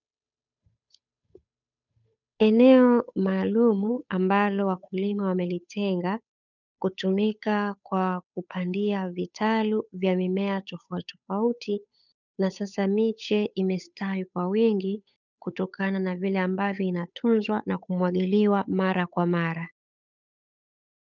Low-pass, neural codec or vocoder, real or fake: 7.2 kHz; codec, 16 kHz, 8 kbps, FunCodec, trained on Chinese and English, 25 frames a second; fake